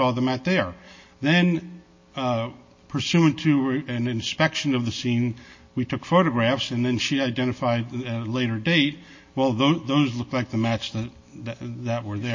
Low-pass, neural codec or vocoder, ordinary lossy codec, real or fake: 7.2 kHz; none; MP3, 32 kbps; real